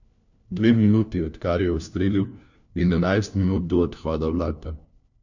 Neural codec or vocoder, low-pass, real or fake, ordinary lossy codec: codec, 16 kHz, 1 kbps, FunCodec, trained on LibriTTS, 50 frames a second; 7.2 kHz; fake; none